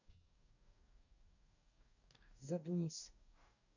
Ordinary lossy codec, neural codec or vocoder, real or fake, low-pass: none; codec, 44.1 kHz, 2.6 kbps, DAC; fake; 7.2 kHz